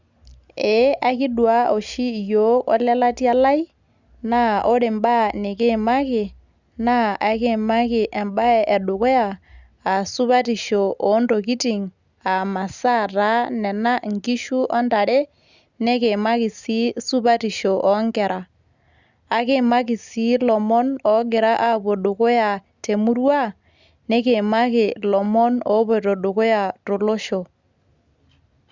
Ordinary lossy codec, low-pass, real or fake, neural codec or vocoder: Opus, 64 kbps; 7.2 kHz; real; none